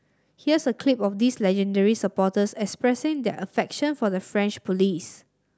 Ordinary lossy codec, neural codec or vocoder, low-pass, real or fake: none; none; none; real